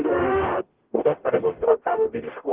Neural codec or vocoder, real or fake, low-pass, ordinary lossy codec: codec, 44.1 kHz, 0.9 kbps, DAC; fake; 3.6 kHz; Opus, 16 kbps